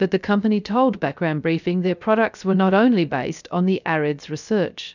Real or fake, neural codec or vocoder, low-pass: fake; codec, 16 kHz, 0.3 kbps, FocalCodec; 7.2 kHz